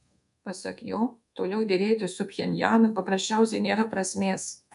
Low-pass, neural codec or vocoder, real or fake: 10.8 kHz; codec, 24 kHz, 1.2 kbps, DualCodec; fake